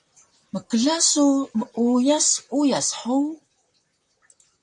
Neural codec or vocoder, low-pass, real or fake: vocoder, 44.1 kHz, 128 mel bands, Pupu-Vocoder; 10.8 kHz; fake